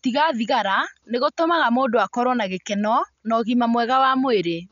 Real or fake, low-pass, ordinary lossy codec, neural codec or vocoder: real; 7.2 kHz; none; none